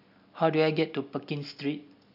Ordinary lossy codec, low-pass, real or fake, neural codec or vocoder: none; 5.4 kHz; real; none